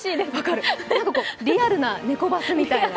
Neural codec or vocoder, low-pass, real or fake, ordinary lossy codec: none; none; real; none